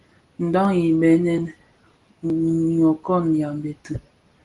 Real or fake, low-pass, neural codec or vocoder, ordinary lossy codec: real; 10.8 kHz; none; Opus, 24 kbps